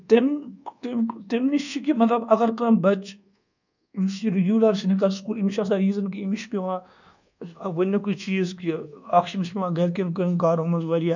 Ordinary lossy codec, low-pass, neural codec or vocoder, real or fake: none; 7.2 kHz; codec, 24 kHz, 1.2 kbps, DualCodec; fake